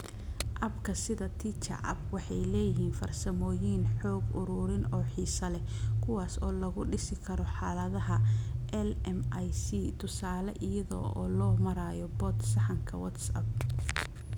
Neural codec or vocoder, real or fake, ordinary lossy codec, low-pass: none; real; none; none